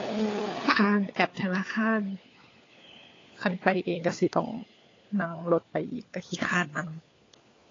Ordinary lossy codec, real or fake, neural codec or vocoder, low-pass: AAC, 32 kbps; fake; codec, 16 kHz, 4 kbps, FunCodec, trained on LibriTTS, 50 frames a second; 7.2 kHz